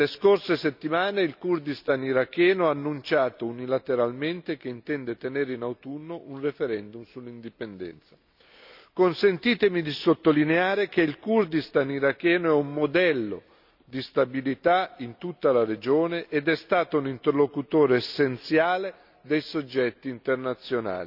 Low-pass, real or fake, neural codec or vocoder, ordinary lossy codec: 5.4 kHz; real; none; none